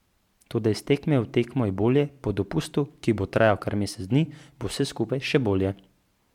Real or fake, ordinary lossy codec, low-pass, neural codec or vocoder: fake; MP3, 96 kbps; 19.8 kHz; vocoder, 44.1 kHz, 128 mel bands every 512 samples, BigVGAN v2